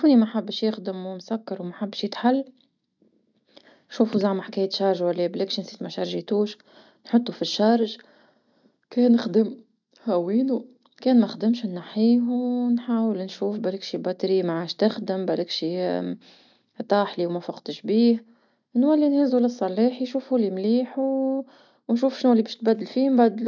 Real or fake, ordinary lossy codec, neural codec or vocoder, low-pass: real; none; none; 7.2 kHz